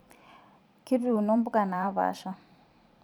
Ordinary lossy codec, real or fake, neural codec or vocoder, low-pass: none; fake; vocoder, 44.1 kHz, 128 mel bands every 512 samples, BigVGAN v2; 19.8 kHz